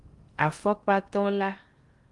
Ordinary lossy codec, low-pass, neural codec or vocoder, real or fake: Opus, 24 kbps; 10.8 kHz; codec, 16 kHz in and 24 kHz out, 0.6 kbps, FocalCodec, streaming, 4096 codes; fake